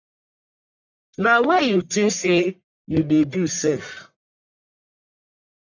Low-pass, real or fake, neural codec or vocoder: 7.2 kHz; fake; codec, 44.1 kHz, 1.7 kbps, Pupu-Codec